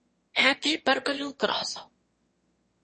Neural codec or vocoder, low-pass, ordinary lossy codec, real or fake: autoencoder, 22.05 kHz, a latent of 192 numbers a frame, VITS, trained on one speaker; 9.9 kHz; MP3, 32 kbps; fake